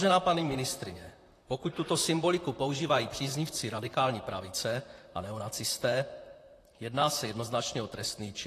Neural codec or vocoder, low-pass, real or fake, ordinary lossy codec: vocoder, 44.1 kHz, 128 mel bands, Pupu-Vocoder; 14.4 kHz; fake; AAC, 48 kbps